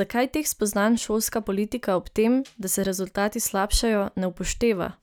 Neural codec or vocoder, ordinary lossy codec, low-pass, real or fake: none; none; none; real